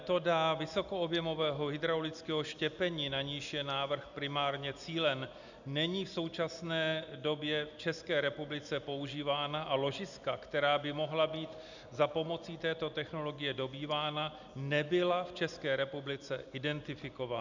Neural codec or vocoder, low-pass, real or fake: none; 7.2 kHz; real